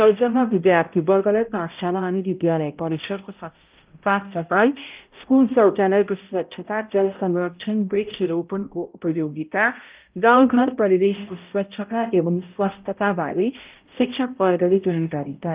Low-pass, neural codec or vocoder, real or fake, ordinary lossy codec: 3.6 kHz; codec, 16 kHz, 0.5 kbps, X-Codec, HuBERT features, trained on balanced general audio; fake; Opus, 24 kbps